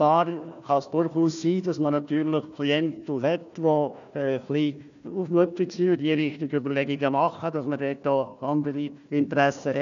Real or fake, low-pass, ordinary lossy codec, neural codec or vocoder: fake; 7.2 kHz; none; codec, 16 kHz, 1 kbps, FunCodec, trained on Chinese and English, 50 frames a second